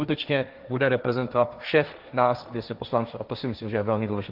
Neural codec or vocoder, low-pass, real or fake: codec, 16 kHz, 1.1 kbps, Voila-Tokenizer; 5.4 kHz; fake